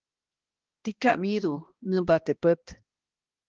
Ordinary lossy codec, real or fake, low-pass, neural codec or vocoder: Opus, 16 kbps; fake; 7.2 kHz; codec, 16 kHz, 1 kbps, X-Codec, HuBERT features, trained on balanced general audio